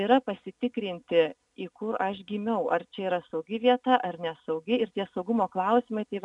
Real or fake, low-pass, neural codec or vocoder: real; 10.8 kHz; none